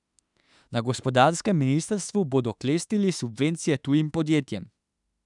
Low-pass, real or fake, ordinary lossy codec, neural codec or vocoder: 10.8 kHz; fake; none; autoencoder, 48 kHz, 32 numbers a frame, DAC-VAE, trained on Japanese speech